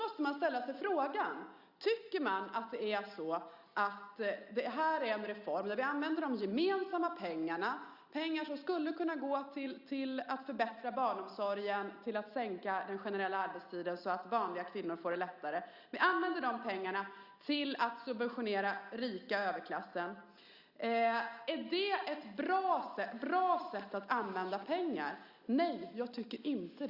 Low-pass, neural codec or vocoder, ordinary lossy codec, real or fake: 5.4 kHz; none; Opus, 64 kbps; real